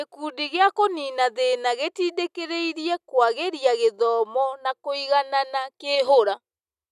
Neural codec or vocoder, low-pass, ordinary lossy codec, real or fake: none; 14.4 kHz; none; real